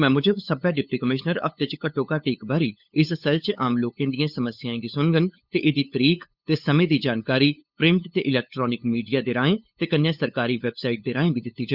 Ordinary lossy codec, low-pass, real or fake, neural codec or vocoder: Opus, 64 kbps; 5.4 kHz; fake; codec, 16 kHz, 8 kbps, FunCodec, trained on LibriTTS, 25 frames a second